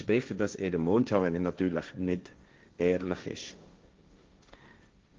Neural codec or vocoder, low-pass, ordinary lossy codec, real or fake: codec, 16 kHz, 1.1 kbps, Voila-Tokenizer; 7.2 kHz; Opus, 24 kbps; fake